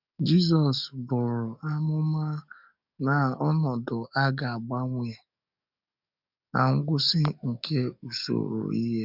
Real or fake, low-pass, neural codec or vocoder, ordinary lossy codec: fake; 5.4 kHz; codec, 44.1 kHz, 7.8 kbps, DAC; none